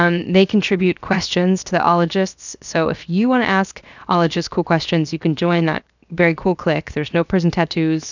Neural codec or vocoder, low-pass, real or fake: codec, 16 kHz, 0.7 kbps, FocalCodec; 7.2 kHz; fake